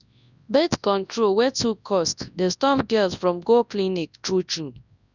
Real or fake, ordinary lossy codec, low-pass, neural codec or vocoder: fake; none; 7.2 kHz; codec, 24 kHz, 0.9 kbps, WavTokenizer, large speech release